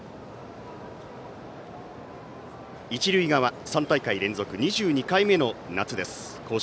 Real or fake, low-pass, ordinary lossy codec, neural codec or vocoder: real; none; none; none